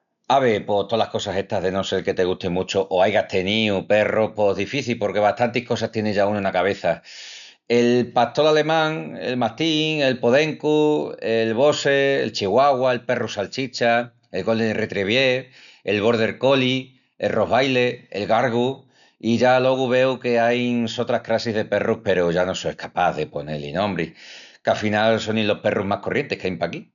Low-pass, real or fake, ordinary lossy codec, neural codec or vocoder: 7.2 kHz; real; none; none